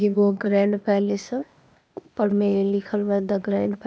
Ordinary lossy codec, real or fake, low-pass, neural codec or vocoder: none; fake; none; codec, 16 kHz, 0.8 kbps, ZipCodec